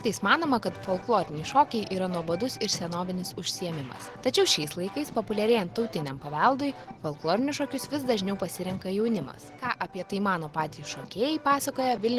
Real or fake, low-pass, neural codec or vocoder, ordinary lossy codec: real; 14.4 kHz; none; Opus, 16 kbps